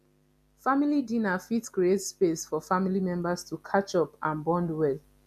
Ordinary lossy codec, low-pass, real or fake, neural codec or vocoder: MP3, 96 kbps; 14.4 kHz; real; none